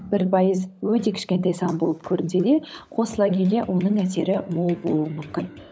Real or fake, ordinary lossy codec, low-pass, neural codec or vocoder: fake; none; none; codec, 16 kHz, 16 kbps, FunCodec, trained on LibriTTS, 50 frames a second